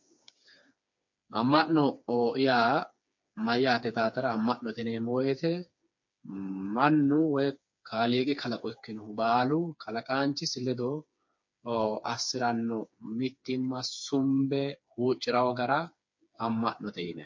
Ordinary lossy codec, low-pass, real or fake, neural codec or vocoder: MP3, 48 kbps; 7.2 kHz; fake; codec, 16 kHz, 4 kbps, FreqCodec, smaller model